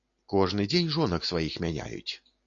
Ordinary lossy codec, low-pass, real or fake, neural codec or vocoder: AAC, 48 kbps; 7.2 kHz; real; none